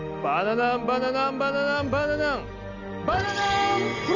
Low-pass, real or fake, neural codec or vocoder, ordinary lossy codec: 7.2 kHz; real; none; none